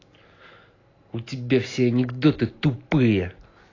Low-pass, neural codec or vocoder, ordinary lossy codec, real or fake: 7.2 kHz; none; AAC, 32 kbps; real